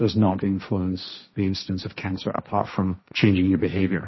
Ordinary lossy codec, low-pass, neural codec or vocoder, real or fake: MP3, 24 kbps; 7.2 kHz; codec, 16 kHz, 1.1 kbps, Voila-Tokenizer; fake